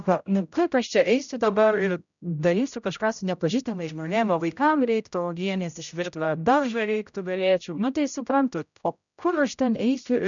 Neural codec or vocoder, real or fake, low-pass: codec, 16 kHz, 0.5 kbps, X-Codec, HuBERT features, trained on general audio; fake; 7.2 kHz